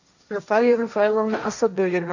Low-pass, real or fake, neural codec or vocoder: 7.2 kHz; fake; codec, 16 kHz, 1.1 kbps, Voila-Tokenizer